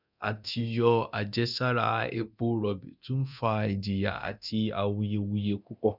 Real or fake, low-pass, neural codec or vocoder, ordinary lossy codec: fake; 5.4 kHz; codec, 24 kHz, 0.9 kbps, DualCodec; none